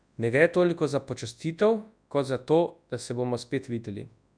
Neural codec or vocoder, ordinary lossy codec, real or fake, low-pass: codec, 24 kHz, 0.9 kbps, WavTokenizer, large speech release; none; fake; 9.9 kHz